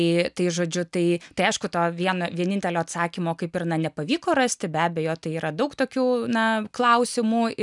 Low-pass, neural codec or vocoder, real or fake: 10.8 kHz; none; real